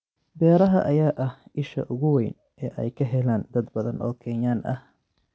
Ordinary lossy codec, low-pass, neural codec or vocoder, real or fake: none; none; none; real